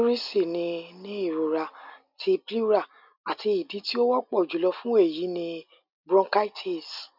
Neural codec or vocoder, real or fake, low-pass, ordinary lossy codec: none; real; 5.4 kHz; none